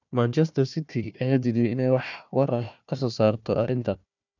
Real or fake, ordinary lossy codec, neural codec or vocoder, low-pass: fake; none; codec, 16 kHz, 1 kbps, FunCodec, trained on Chinese and English, 50 frames a second; 7.2 kHz